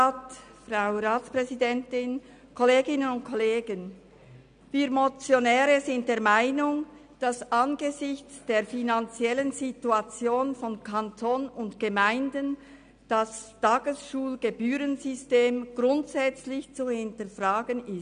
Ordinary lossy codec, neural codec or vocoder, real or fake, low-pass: none; none; real; 9.9 kHz